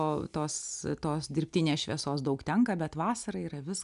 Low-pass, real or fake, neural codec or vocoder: 10.8 kHz; real; none